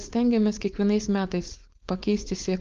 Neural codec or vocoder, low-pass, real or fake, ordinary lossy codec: codec, 16 kHz, 4.8 kbps, FACodec; 7.2 kHz; fake; Opus, 32 kbps